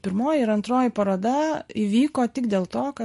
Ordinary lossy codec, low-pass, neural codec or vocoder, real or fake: MP3, 48 kbps; 14.4 kHz; none; real